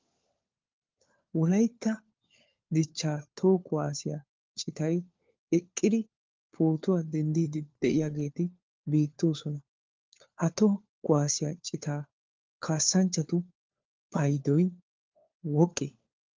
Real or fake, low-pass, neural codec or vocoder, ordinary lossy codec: fake; 7.2 kHz; codec, 16 kHz, 4 kbps, FunCodec, trained on LibriTTS, 50 frames a second; Opus, 24 kbps